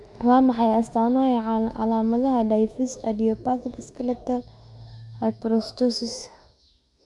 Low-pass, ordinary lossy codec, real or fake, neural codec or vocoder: 10.8 kHz; none; fake; autoencoder, 48 kHz, 32 numbers a frame, DAC-VAE, trained on Japanese speech